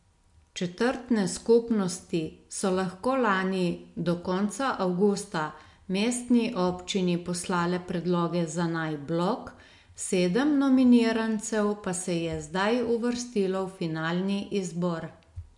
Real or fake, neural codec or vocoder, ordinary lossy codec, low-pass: real; none; MP3, 64 kbps; 10.8 kHz